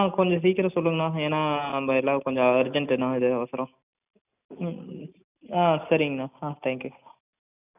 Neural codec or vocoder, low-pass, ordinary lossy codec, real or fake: none; 3.6 kHz; none; real